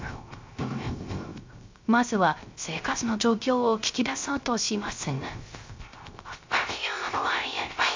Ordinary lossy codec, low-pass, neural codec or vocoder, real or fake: MP3, 64 kbps; 7.2 kHz; codec, 16 kHz, 0.3 kbps, FocalCodec; fake